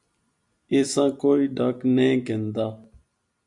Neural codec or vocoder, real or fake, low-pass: none; real; 10.8 kHz